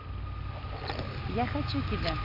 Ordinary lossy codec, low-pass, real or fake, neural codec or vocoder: none; 5.4 kHz; real; none